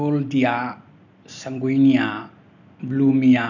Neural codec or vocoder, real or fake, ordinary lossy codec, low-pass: none; real; none; 7.2 kHz